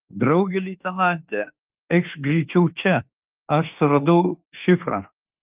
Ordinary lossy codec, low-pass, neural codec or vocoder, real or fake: Opus, 32 kbps; 3.6 kHz; autoencoder, 48 kHz, 32 numbers a frame, DAC-VAE, trained on Japanese speech; fake